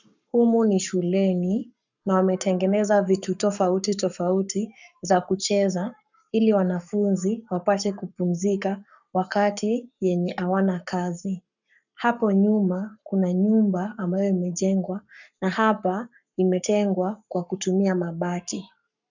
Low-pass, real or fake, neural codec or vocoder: 7.2 kHz; fake; codec, 44.1 kHz, 7.8 kbps, Pupu-Codec